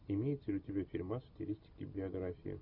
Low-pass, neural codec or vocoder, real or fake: 5.4 kHz; none; real